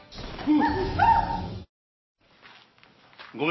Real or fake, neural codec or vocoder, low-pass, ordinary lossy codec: real; none; 7.2 kHz; MP3, 24 kbps